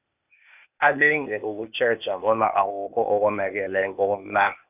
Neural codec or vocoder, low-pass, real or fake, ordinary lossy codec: codec, 16 kHz, 0.8 kbps, ZipCodec; 3.6 kHz; fake; none